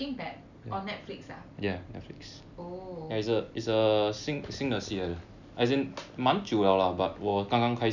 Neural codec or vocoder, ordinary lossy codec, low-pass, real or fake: none; none; 7.2 kHz; real